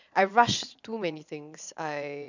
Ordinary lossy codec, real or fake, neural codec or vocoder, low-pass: none; fake; vocoder, 22.05 kHz, 80 mel bands, Vocos; 7.2 kHz